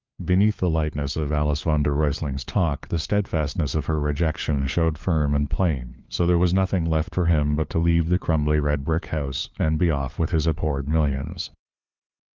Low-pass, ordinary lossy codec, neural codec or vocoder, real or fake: 7.2 kHz; Opus, 24 kbps; codec, 16 kHz, 4 kbps, FunCodec, trained on LibriTTS, 50 frames a second; fake